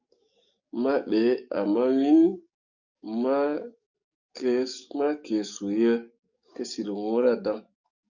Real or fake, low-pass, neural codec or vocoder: fake; 7.2 kHz; codec, 44.1 kHz, 7.8 kbps, DAC